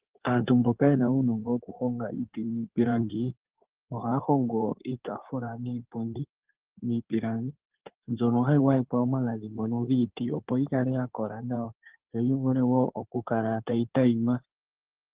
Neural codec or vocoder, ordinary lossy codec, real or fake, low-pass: codec, 16 kHz in and 24 kHz out, 2.2 kbps, FireRedTTS-2 codec; Opus, 16 kbps; fake; 3.6 kHz